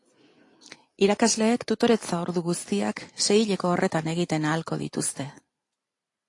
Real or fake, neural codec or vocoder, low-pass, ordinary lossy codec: fake; vocoder, 44.1 kHz, 128 mel bands every 256 samples, BigVGAN v2; 10.8 kHz; AAC, 48 kbps